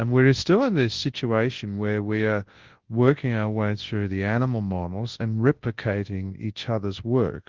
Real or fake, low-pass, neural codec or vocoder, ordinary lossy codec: fake; 7.2 kHz; codec, 24 kHz, 0.9 kbps, WavTokenizer, large speech release; Opus, 16 kbps